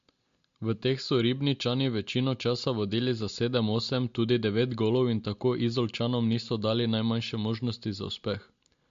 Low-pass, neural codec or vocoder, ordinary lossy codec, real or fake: 7.2 kHz; none; MP3, 48 kbps; real